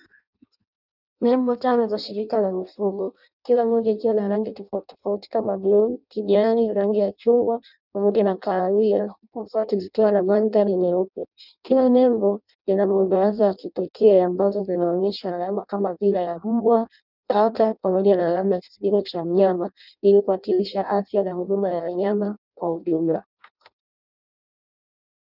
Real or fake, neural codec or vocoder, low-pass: fake; codec, 16 kHz in and 24 kHz out, 0.6 kbps, FireRedTTS-2 codec; 5.4 kHz